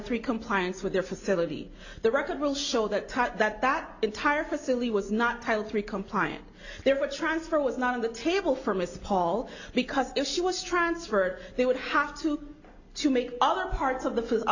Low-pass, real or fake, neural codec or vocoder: 7.2 kHz; real; none